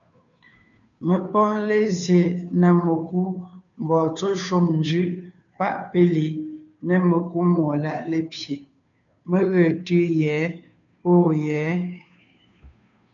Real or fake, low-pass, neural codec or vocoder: fake; 7.2 kHz; codec, 16 kHz, 2 kbps, FunCodec, trained on Chinese and English, 25 frames a second